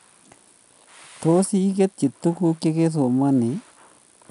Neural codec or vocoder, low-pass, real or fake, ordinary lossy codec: none; 10.8 kHz; real; none